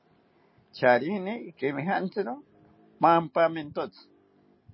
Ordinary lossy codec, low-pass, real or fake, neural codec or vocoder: MP3, 24 kbps; 7.2 kHz; real; none